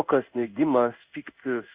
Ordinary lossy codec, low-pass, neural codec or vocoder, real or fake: Opus, 64 kbps; 3.6 kHz; codec, 16 kHz in and 24 kHz out, 1 kbps, XY-Tokenizer; fake